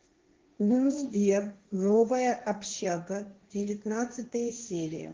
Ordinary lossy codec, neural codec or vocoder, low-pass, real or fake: Opus, 32 kbps; codec, 16 kHz, 1.1 kbps, Voila-Tokenizer; 7.2 kHz; fake